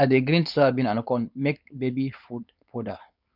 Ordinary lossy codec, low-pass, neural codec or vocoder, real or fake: none; 5.4 kHz; codec, 16 kHz, 4.8 kbps, FACodec; fake